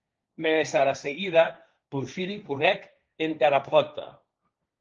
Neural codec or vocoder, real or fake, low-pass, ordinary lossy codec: codec, 16 kHz, 1.1 kbps, Voila-Tokenizer; fake; 7.2 kHz; Opus, 24 kbps